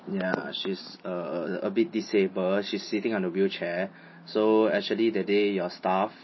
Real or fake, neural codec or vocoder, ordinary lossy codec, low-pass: real; none; MP3, 24 kbps; 7.2 kHz